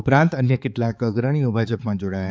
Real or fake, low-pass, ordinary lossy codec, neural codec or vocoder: fake; none; none; codec, 16 kHz, 4 kbps, X-Codec, HuBERT features, trained on balanced general audio